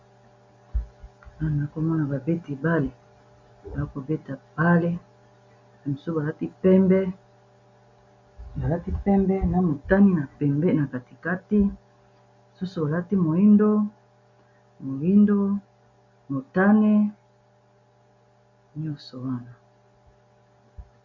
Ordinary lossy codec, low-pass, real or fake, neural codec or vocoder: MP3, 48 kbps; 7.2 kHz; real; none